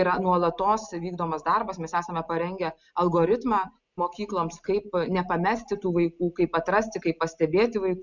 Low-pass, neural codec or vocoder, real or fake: 7.2 kHz; none; real